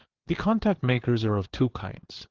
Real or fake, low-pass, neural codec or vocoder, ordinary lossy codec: fake; 7.2 kHz; codec, 16 kHz, 4 kbps, FreqCodec, larger model; Opus, 16 kbps